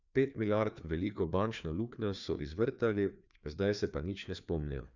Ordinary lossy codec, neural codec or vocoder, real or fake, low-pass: none; codec, 16 kHz, 2 kbps, FreqCodec, larger model; fake; 7.2 kHz